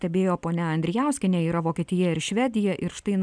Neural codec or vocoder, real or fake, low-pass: none; real; 9.9 kHz